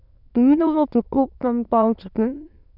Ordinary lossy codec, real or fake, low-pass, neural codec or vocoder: none; fake; 5.4 kHz; autoencoder, 22.05 kHz, a latent of 192 numbers a frame, VITS, trained on many speakers